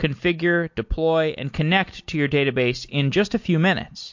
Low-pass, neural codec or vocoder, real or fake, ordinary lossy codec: 7.2 kHz; none; real; MP3, 48 kbps